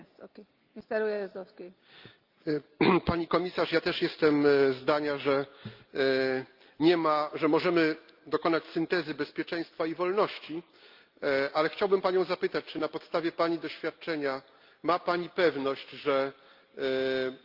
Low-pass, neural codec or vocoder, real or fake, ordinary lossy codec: 5.4 kHz; none; real; Opus, 24 kbps